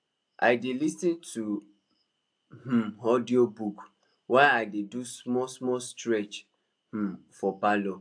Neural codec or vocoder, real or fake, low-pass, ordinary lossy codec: none; real; 9.9 kHz; AAC, 64 kbps